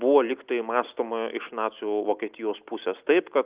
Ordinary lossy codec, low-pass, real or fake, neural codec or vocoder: Opus, 24 kbps; 3.6 kHz; real; none